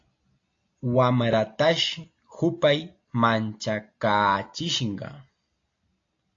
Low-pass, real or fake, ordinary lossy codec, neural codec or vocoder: 7.2 kHz; real; AAC, 48 kbps; none